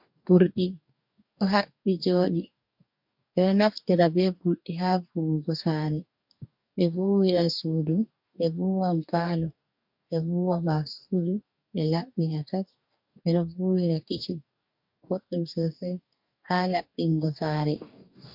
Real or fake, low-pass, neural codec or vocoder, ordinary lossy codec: fake; 5.4 kHz; codec, 44.1 kHz, 2.6 kbps, DAC; MP3, 48 kbps